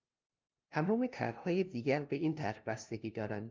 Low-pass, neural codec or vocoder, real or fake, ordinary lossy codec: 7.2 kHz; codec, 16 kHz, 0.5 kbps, FunCodec, trained on LibriTTS, 25 frames a second; fake; Opus, 24 kbps